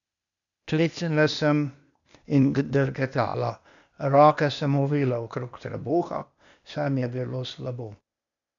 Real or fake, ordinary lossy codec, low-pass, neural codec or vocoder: fake; none; 7.2 kHz; codec, 16 kHz, 0.8 kbps, ZipCodec